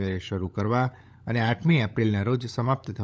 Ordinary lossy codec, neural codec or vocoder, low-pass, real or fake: none; codec, 16 kHz, 16 kbps, FunCodec, trained on LibriTTS, 50 frames a second; none; fake